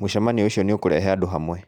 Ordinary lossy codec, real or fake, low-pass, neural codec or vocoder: none; real; 19.8 kHz; none